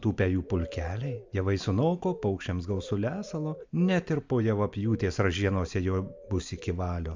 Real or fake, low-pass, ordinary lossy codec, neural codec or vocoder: real; 7.2 kHz; MP3, 64 kbps; none